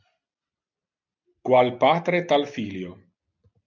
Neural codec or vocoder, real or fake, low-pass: none; real; 7.2 kHz